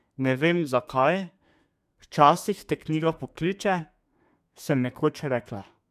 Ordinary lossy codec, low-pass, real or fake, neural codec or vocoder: MP3, 96 kbps; 14.4 kHz; fake; codec, 32 kHz, 1.9 kbps, SNAC